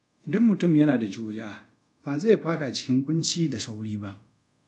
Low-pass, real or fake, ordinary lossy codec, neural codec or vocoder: 10.8 kHz; fake; none; codec, 24 kHz, 0.5 kbps, DualCodec